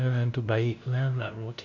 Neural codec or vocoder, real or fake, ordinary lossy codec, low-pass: codec, 16 kHz, 0.5 kbps, FunCodec, trained on LibriTTS, 25 frames a second; fake; none; 7.2 kHz